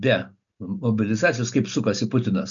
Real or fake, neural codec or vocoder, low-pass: real; none; 7.2 kHz